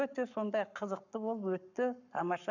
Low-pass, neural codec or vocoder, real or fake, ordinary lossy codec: 7.2 kHz; codec, 44.1 kHz, 7.8 kbps, Pupu-Codec; fake; none